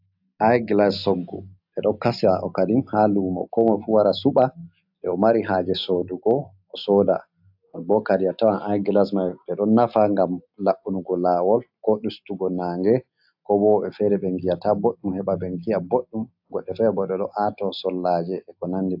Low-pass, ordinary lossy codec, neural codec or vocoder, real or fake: 5.4 kHz; AAC, 48 kbps; none; real